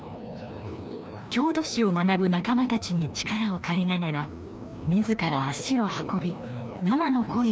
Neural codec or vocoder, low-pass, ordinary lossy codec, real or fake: codec, 16 kHz, 1 kbps, FreqCodec, larger model; none; none; fake